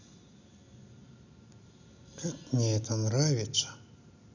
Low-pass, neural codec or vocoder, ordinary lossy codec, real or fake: 7.2 kHz; none; none; real